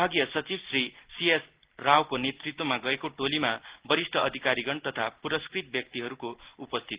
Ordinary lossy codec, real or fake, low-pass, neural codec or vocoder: Opus, 16 kbps; real; 3.6 kHz; none